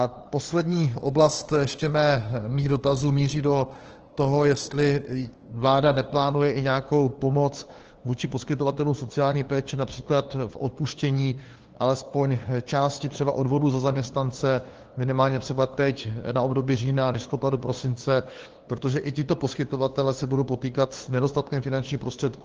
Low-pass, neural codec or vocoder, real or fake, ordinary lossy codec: 7.2 kHz; codec, 16 kHz, 2 kbps, FunCodec, trained on LibriTTS, 25 frames a second; fake; Opus, 16 kbps